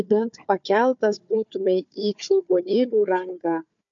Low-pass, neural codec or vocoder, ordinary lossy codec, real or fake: 7.2 kHz; codec, 16 kHz, 4 kbps, FunCodec, trained on Chinese and English, 50 frames a second; MP3, 48 kbps; fake